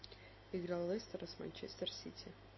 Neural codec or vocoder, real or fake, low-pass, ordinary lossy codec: none; real; 7.2 kHz; MP3, 24 kbps